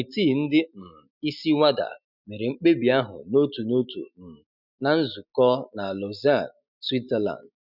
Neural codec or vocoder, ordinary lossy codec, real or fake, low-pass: none; none; real; 5.4 kHz